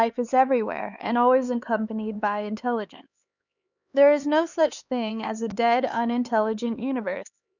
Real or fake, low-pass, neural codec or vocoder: fake; 7.2 kHz; codec, 16 kHz, 4 kbps, X-Codec, HuBERT features, trained on LibriSpeech